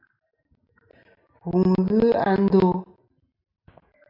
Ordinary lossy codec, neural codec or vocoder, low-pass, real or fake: MP3, 48 kbps; none; 5.4 kHz; real